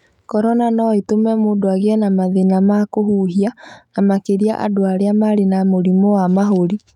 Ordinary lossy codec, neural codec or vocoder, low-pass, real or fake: none; autoencoder, 48 kHz, 128 numbers a frame, DAC-VAE, trained on Japanese speech; 19.8 kHz; fake